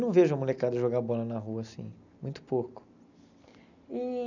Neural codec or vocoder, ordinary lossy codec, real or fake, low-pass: none; none; real; 7.2 kHz